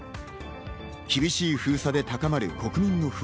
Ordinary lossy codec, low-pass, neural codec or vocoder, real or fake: none; none; none; real